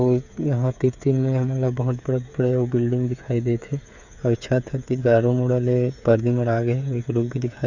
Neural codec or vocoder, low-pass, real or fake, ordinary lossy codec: codec, 16 kHz, 8 kbps, FreqCodec, smaller model; 7.2 kHz; fake; none